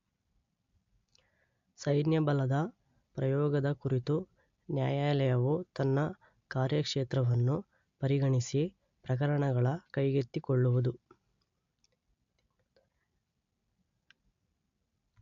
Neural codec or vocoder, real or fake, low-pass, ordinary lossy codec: none; real; 7.2 kHz; AAC, 64 kbps